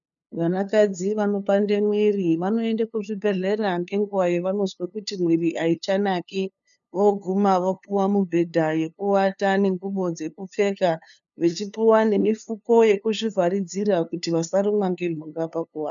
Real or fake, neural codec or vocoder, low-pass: fake; codec, 16 kHz, 2 kbps, FunCodec, trained on LibriTTS, 25 frames a second; 7.2 kHz